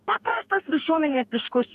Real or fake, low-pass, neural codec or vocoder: fake; 14.4 kHz; codec, 44.1 kHz, 2.6 kbps, DAC